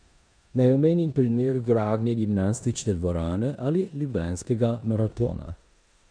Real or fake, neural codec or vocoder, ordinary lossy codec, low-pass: fake; codec, 16 kHz in and 24 kHz out, 0.9 kbps, LongCat-Audio-Codec, four codebook decoder; MP3, 96 kbps; 9.9 kHz